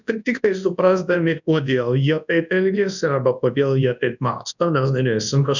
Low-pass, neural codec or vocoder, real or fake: 7.2 kHz; codec, 24 kHz, 0.9 kbps, WavTokenizer, large speech release; fake